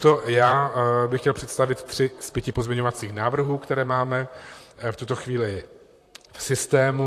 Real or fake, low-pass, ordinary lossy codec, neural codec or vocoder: fake; 14.4 kHz; AAC, 64 kbps; vocoder, 44.1 kHz, 128 mel bands, Pupu-Vocoder